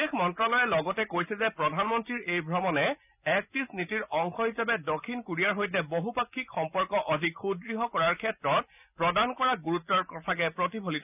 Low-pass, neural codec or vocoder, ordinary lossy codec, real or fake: 3.6 kHz; none; none; real